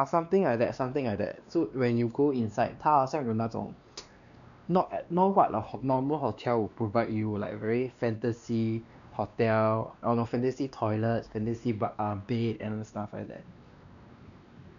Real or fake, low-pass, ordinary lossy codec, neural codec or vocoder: fake; 7.2 kHz; none; codec, 16 kHz, 2 kbps, X-Codec, WavLM features, trained on Multilingual LibriSpeech